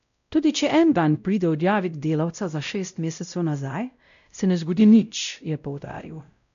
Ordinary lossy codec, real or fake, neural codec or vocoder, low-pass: none; fake; codec, 16 kHz, 0.5 kbps, X-Codec, WavLM features, trained on Multilingual LibriSpeech; 7.2 kHz